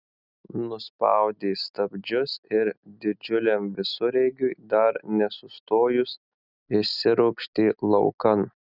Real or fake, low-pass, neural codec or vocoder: real; 5.4 kHz; none